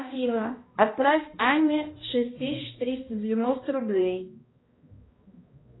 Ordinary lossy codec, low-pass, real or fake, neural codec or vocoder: AAC, 16 kbps; 7.2 kHz; fake; codec, 16 kHz, 1 kbps, X-Codec, HuBERT features, trained on balanced general audio